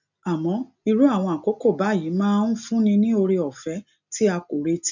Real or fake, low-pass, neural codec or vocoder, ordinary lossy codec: real; 7.2 kHz; none; none